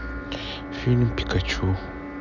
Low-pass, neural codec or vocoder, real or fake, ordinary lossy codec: 7.2 kHz; none; real; none